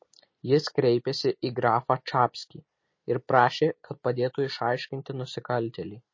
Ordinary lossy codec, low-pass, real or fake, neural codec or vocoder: MP3, 32 kbps; 7.2 kHz; real; none